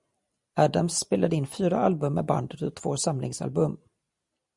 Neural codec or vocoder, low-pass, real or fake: none; 10.8 kHz; real